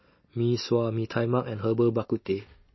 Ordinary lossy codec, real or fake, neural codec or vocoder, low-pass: MP3, 24 kbps; real; none; 7.2 kHz